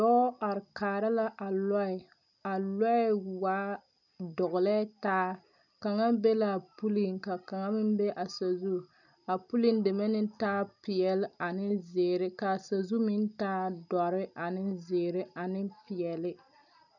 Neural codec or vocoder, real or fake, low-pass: none; real; 7.2 kHz